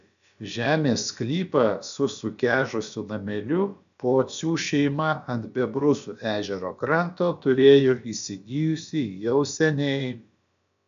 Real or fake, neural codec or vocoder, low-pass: fake; codec, 16 kHz, about 1 kbps, DyCAST, with the encoder's durations; 7.2 kHz